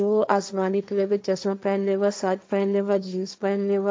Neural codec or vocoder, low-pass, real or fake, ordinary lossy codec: codec, 16 kHz, 1.1 kbps, Voila-Tokenizer; none; fake; none